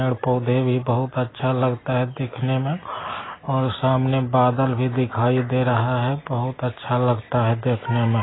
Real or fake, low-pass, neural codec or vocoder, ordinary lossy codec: real; 7.2 kHz; none; AAC, 16 kbps